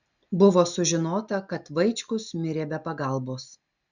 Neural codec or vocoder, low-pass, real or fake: none; 7.2 kHz; real